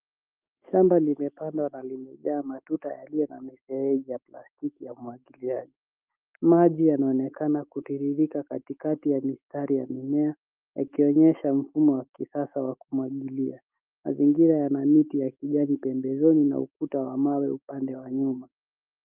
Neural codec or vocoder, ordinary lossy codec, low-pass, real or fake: autoencoder, 48 kHz, 128 numbers a frame, DAC-VAE, trained on Japanese speech; Opus, 32 kbps; 3.6 kHz; fake